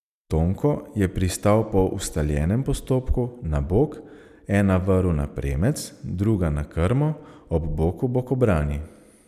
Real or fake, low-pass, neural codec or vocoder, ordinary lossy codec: real; 14.4 kHz; none; none